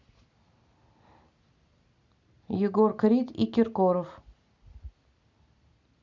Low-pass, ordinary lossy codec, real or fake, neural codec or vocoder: 7.2 kHz; Opus, 64 kbps; real; none